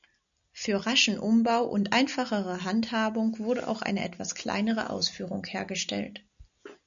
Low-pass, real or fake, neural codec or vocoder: 7.2 kHz; real; none